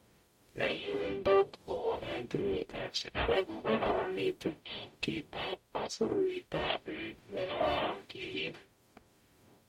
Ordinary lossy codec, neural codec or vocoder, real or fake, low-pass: MP3, 64 kbps; codec, 44.1 kHz, 0.9 kbps, DAC; fake; 19.8 kHz